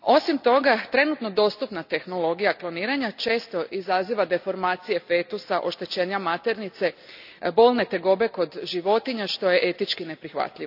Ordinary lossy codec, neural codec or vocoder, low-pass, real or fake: none; none; 5.4 kHz; real